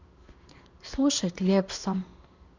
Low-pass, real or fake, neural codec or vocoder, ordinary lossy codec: 7.2 kHz; fake; codec, 16 kHz in and 24 kHz out, 1 kbps, XY-Tokenizer; Opus, 64 kbps